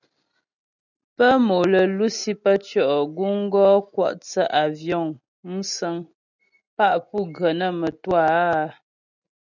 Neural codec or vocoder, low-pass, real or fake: none; 7.2 kHz; real